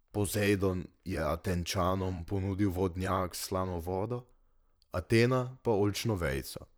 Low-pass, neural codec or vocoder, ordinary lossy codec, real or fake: none; vocoder, 44.1 kHz, 128 mel bands, Pupu-Vocoder; none; fake